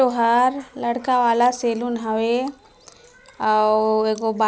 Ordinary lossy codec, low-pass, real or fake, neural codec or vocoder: none; none; real; none